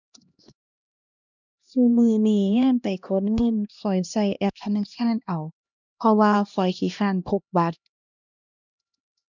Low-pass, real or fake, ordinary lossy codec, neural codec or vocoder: 7.2 kHz; fake; none; codec, 16 kHz, 1 kbps, X-Codec, HuBERT features, trained on LibriSpeech